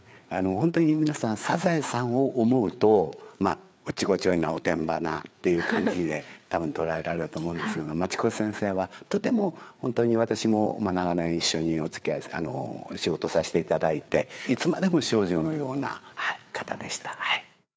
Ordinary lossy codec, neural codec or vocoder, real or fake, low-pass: none; codec, 16 kHz, 4 kbps, FreqCodec, larger model; fake; none